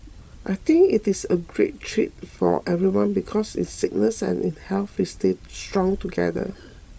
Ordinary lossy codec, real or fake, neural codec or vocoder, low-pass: none; fake; codec, 16 kHz, 16 kbps, FreqCodec, larger model; none